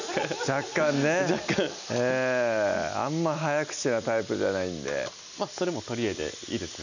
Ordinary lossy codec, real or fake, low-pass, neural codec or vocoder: none; real; 7.2 kHz; none